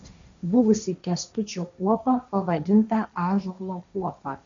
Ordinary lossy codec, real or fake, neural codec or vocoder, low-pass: MP3, 64 kbps; fake; codec, 16 kHz, 1.1 kbps, Voila-Tokenizer; 7.2 kHz